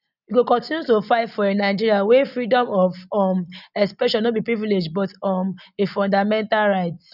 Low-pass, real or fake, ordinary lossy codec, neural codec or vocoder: 5.4 kHz; real; none; none